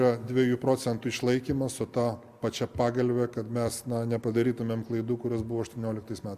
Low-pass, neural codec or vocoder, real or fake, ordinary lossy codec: 14.4 kHz; none; real; Opus, 64 kbps